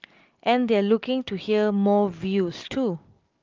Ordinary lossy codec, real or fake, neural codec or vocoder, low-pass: Opus, 24 kbps; real; none; 7.2 kHz